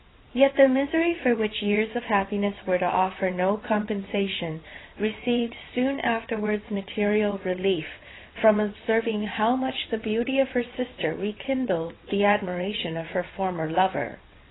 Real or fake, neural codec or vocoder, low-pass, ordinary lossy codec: fake; vocoder, 22.05 kHz, 80 mel bands, WaveNeXt; 7.2 kHz; AAC, 16 kbps